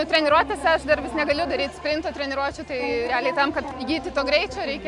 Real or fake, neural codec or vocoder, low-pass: real; none; 10.8 kHz